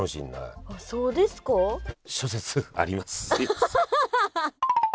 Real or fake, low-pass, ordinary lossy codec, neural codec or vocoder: real; none; none; none